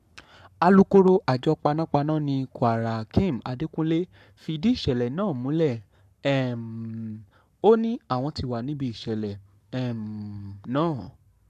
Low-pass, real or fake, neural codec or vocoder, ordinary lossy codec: 14.4 kHz; fake; codec, 44.1 kHz, 7.8 kbps, Pupu-Codec; none